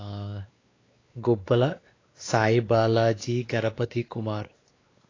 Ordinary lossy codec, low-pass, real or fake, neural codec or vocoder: AAC, 32 kbps; 7.2 kHz; fake; codec, 16 kHz, 2 kbps, X-Codec, WavLM features, trained on Multilingual LibriSpeech